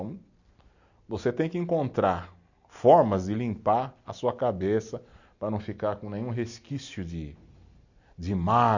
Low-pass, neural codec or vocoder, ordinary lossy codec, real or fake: 7.2 kHz; none; none; real